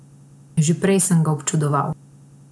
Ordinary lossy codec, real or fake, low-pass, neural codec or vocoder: none; real; none; none